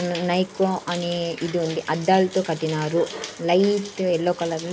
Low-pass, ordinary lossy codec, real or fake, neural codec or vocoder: none; none; real; none